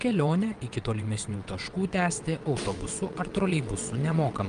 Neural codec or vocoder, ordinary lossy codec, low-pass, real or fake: vocoder, 22.05 kHz, 80 mel bands, WaveNeXt; Opus, 32 kbps; 9.9 kHz; fake